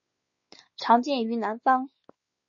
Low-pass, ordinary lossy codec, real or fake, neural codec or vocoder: 7.2 kHz; MP3, 32 kbps; fake; codec, 16 kHz, 4 kbps, X-Codec, WavLM features, trained on Multilingual LibriSpeech